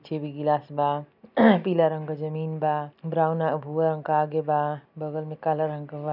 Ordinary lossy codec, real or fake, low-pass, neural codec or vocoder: none; real; 5.4 kHz; none